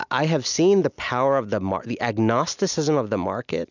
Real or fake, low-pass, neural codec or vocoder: real; 7.2 kHz; none